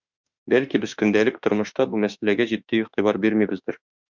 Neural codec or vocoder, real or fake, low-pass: autoencoder, 48 kHz, 32 numbers a frame, DAC-VAE, trained on Japanese speech; fake; 7.2 kHz